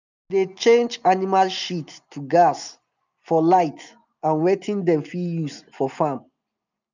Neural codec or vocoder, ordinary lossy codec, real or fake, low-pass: none; none; real; 7.2 kHz